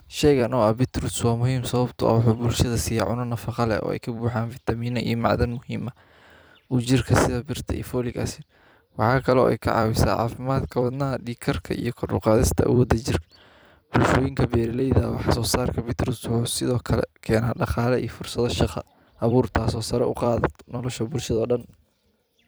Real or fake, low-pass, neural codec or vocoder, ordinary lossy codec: fake; none; vocoder, 44.1 kHz, 128 mel bands every 256 samples, BigVGAN v2; none